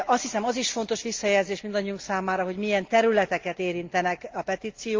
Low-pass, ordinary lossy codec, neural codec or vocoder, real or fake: 7.2 kHz; Opus, 32 kbps; none; real